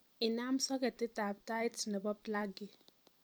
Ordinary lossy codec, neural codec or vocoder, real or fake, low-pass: none; vocoder, 44.1 kHz, 128 mel bands every 256 samples, BigVGAN v2; fake; none